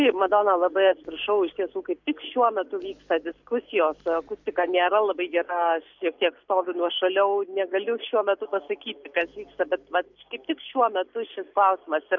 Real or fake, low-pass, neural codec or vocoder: real; 7.2 kHz; none